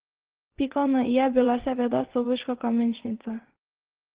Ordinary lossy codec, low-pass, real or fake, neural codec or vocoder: Opus, 16 kbps; 3.6 kHz; fake; vocoder, 22.05 kHz, 80 mel bands, WaveNeXt